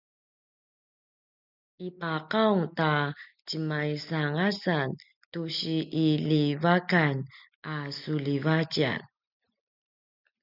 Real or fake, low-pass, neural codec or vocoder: real; 5.4 kHz; none